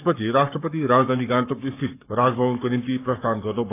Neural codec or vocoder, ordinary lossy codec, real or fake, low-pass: codec, 44.1 kHz, 7.8 kbps, Pupu-Codec; AAC, 24 kbps; fake; 3.6 kHz